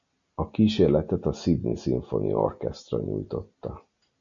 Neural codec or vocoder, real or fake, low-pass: none; real; 7.2 kHz